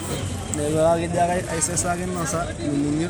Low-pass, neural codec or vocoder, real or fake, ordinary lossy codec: none; none; real; none